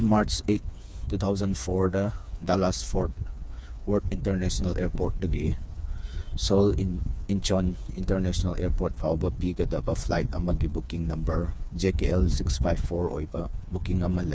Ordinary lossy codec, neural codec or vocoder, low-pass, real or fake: none; codec, 16 kHz, 4 kbps, FreqCodec, smaller model; none; fake